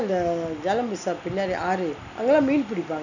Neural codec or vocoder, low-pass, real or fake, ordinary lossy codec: none; 7.2 kHz; real; none